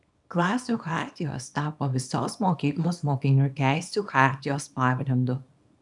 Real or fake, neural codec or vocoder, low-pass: fake; codec, 24 kHz, 0.9 kbps, WavTokenizer, small release; 10.8 kHz